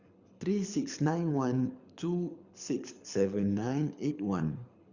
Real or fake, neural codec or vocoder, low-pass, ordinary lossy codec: fake; codec, 24 kHz, 6 kbps, HILCodec; 7.2 kHz; Opus, 64 kbps